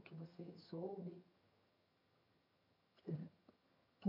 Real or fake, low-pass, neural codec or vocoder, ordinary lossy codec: fake; 5.4 kHz; vocoder, 22.05 kHz, 80 mel bands, HiFi-GAN; none